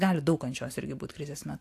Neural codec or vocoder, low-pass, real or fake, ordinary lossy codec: none; 14.4 kHz; real; AAC, 64 kbps